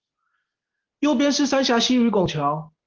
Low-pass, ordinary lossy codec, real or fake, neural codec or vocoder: 7.2 kHz; Opus, 16 kbps; real; none